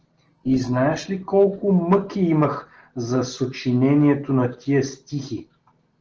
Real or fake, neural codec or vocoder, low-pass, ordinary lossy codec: real; none; 7.2 kHz; Opus, 16 kbps